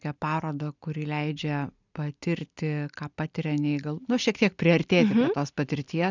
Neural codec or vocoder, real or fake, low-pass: none; real; 7.2 kHz